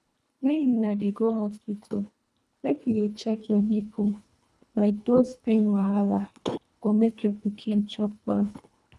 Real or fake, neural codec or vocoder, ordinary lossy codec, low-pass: fake; codec, 24 kHz, 1.5 kbps, HILCodec; none; none